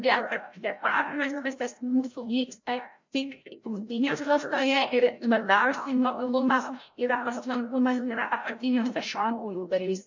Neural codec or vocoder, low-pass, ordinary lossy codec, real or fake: codec, 16 kHz, 0.5 kbps, FreqCodec, larger model; 7.2 kHz; MP3, 48 kbps; fake